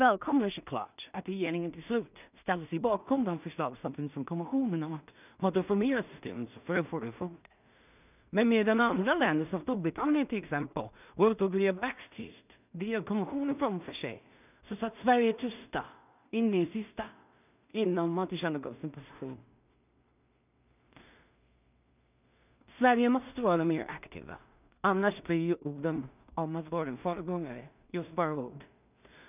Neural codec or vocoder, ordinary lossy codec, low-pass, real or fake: codec, 16 kHz in and 24 kHz out, 0.4 kbps, LongCat-Audio-Codec, two codebook decoder; none; 3.6 kHz; fake